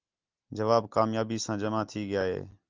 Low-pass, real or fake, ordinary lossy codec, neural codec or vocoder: 7.2 kHz; real; Opus, 32 kbps; none